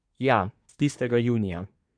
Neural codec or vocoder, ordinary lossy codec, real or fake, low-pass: codec, 24 kHz, 1 kbps, SNAC; MP3, 64 kbps; fake; 9.9 kHz